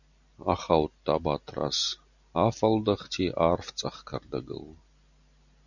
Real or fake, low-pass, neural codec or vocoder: real; 7.2 kHz; none